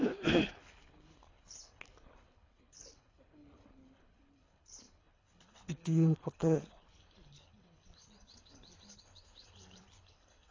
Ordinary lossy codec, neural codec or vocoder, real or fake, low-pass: AAC, 32 kbps; codec, 24 kHz, 3 kbps, HILCodec; fake; 7.2 kHz